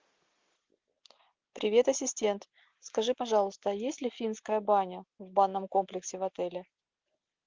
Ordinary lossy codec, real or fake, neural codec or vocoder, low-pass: Opus, 32 kbps; real; none; 7.2 kHz